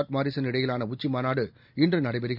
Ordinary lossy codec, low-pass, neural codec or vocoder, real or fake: none; 5.4 kHz; none; real